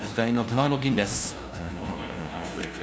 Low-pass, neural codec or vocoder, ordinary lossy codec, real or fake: none; codec, 16 kHz, 0.5 kbps, FunCodec, trained on LibriTTS, 25 frames a second; none; fake